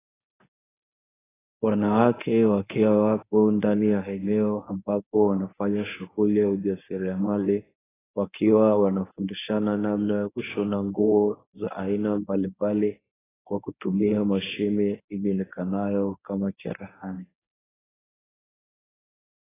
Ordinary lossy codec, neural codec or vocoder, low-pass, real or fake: AAC, 16 kbps; codec, 24 kHz, 0.9 kbps, WavTokenizer, medium speech release version 1; 3.6 kHz; fake